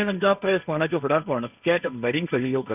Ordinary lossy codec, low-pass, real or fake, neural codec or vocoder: none; 3.6 kHz; fake; codec, 16 kHz, 1.1 kbps, Voila-Tokenizer